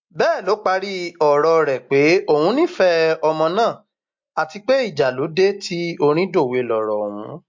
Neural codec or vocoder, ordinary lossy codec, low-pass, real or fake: none; MP3, 48 kbps; 7.2 kHz; real